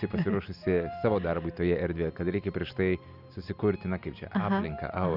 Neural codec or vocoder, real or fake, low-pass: none; real; 5.4 kHz